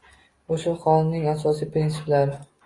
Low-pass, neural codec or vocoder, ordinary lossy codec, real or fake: 10.8 kHz; none; AAC, 32 kbps; real